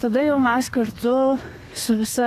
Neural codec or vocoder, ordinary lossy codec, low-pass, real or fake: codec, 32 kHz, 1.9 kbps, SNAC; AAC, 64 kbps; 14.4 kHz; fake